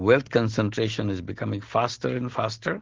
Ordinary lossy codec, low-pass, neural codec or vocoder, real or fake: Opus, 16 kbps; 7.2 kHz; none; real